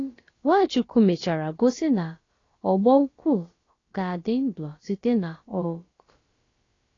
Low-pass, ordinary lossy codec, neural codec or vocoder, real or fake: 7.2 kHz; AAC, 32 kbps; codec, 16 kHz, about 1 kbps, DyCAST, with the encoder's durations; fake